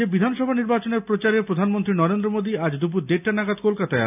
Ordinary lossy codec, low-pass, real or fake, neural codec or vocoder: none; 3.6 kHz; real; none